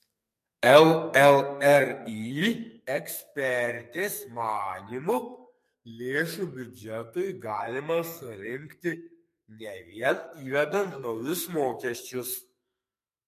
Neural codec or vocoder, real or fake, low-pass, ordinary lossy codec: codec, 44.1 kHz, 2.6 kbps, SNAC; fake; 14.4 kHz; MP3, 64 kbps